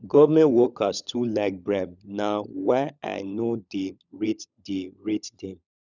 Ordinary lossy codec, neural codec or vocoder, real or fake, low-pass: none; codec, 16 kHz, 16 kbps, FunCodec, trained on LibriTTS, 50 frames a second; fake; 7.2 kHz